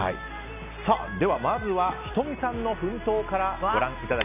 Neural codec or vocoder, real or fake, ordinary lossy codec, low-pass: none; real; AAC, 32 kbps; 3.6 kHz